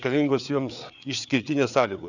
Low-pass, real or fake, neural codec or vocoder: 7.2 kHz; fake; codec, 16 kHz, 4 kbps, FreqCodec, larger model